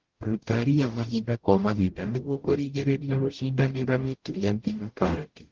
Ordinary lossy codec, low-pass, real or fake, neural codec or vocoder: Opus, 16 kbps; 7.2 kHz; fake; codec, 44.1 kHz, 0.9 kbps, DAC